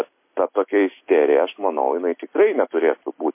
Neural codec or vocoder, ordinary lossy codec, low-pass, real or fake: none; MP3, 24 kbps; 3.6 kHz; real